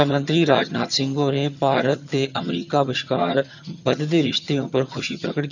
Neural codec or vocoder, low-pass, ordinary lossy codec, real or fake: vocoder, 22.05 kHz, 80 mel bands, HiFi-GAN; 7.2 kHz; none; fake